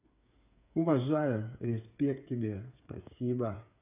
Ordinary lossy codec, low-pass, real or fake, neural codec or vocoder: none; 3.6 kHz; fake; codec, 16 kHz, 8 kbps, FreqCodec, smaller model